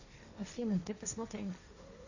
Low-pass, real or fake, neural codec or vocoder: 7.2 kHz; fake; codec, 16 kHz, 1.1 kbps, Voila-Tokenizer